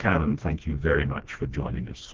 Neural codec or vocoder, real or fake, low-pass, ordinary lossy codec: codec, 16 kHz, 1 kbps, FreqCodec, smaller model; fake; 7.2 kHz; Opus, 16 kbps